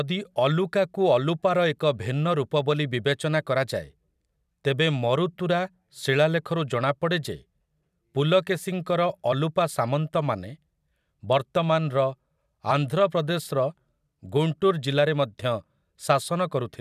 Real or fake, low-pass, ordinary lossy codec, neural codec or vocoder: fake; 14.4 kHz; none; vocoder, 44.1 kHz, 128 mel bands every 256 samples, BigVGAN v2